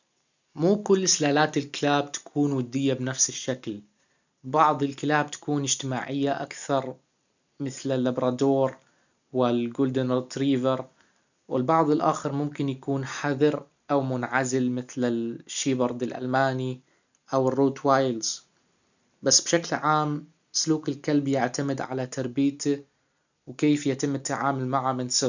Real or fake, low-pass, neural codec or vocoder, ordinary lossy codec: real; 7.2 kHz; none; none